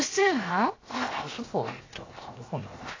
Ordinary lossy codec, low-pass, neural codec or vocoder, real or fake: AAC, 32 kbps; 7.2 kHz; codec, 16 kHz, 0.7 kbps, FocalCodec; fake